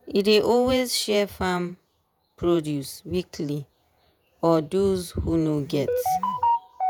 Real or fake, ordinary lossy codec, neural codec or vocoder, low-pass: fake; none; vocoder, 48 kHz, 128 mel bands, Vocos; none